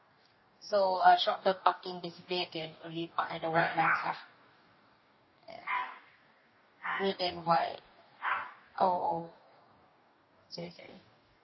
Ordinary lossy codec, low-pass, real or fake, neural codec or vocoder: MP3, 24 kbps; 7.2 kHz; fake; codec, 44.1 kHz, 2.6 kbps, DAC